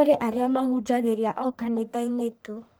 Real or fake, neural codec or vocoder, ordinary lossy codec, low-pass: fake; codec, 44.1 kHz, 1.7 kbps, Pupu-Codec; none; none